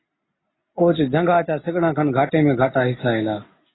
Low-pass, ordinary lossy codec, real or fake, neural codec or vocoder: 7.2 kHz; AAC, 16 kbps; real; none